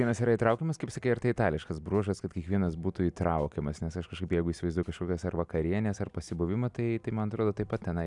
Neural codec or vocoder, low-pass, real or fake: none; 10.8 kHz; real